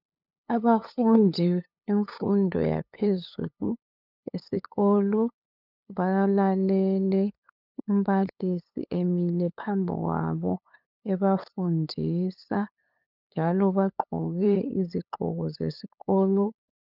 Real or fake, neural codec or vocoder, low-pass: fake; codec, 16 kHz, 8 kbps, FunCodec, trained on LibriTTS, 25 frames a second; 5.4 kHz